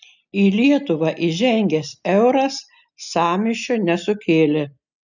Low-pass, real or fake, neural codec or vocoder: 7.2 kHz; real; none